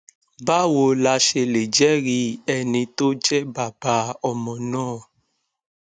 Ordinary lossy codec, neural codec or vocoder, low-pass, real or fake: none; none; 9.9 kHz; real